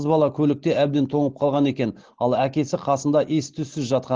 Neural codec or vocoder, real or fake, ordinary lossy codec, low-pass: none; real; Opus, 16 kbps; 7.2 kHz